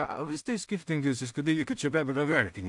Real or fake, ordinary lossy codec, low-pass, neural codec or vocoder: fake; AAC, 64 kbps; 10.8 kHz; codec, 16 kHz in and 24 kHz out, 0.4 kbps, LongCat-Audio-Codec, two codebook decoder